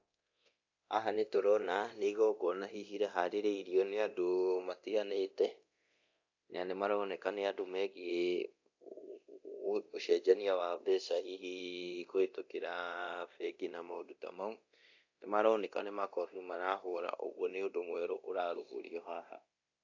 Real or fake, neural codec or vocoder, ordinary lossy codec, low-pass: fake; codec, 24 kHz, 0.9 kbps, DualCodec; AAC, 48 kbps; 7.2 kHz